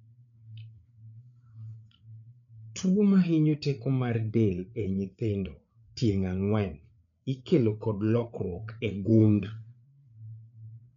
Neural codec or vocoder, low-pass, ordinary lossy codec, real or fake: codec, 16 kHz, 8 kbps, FreqCodec, larger model; 7.2 kHz; none; fake